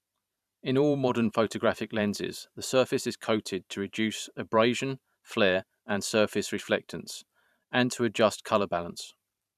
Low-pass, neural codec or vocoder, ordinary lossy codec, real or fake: 14.4 kHz; vocoder, 44.1 kHz, 128 mel bands every 512 samples, BigVGAN v2; none; fake